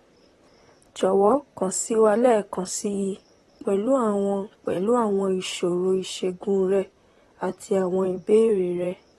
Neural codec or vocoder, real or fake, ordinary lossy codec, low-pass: vocoder, 44.1 kHz, 128 mel bands, Pupu-Vocoder; fake; AAC, 32 kbps; 19.8 kHz